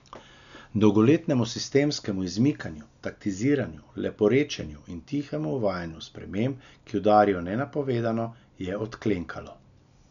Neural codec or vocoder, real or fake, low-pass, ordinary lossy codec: none; real; 7.2 kHz; none